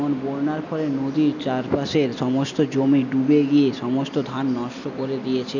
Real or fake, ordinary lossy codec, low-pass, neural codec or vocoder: real; none; 7.2 kHz; none